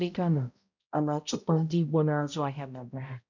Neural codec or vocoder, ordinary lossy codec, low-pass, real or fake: codec, 16 kHz, 0.5 kbps, X-Codec, HuBERT features, trained on balanced general audio; none; 7.2 kHz; fake